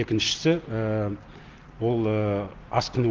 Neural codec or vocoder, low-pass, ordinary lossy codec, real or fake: none; 7.2 kHz; Opus, 16 kbps; real